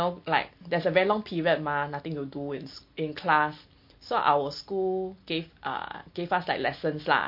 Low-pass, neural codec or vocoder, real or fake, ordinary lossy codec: 5.4 kHz; none; real; MP3, 32 kbps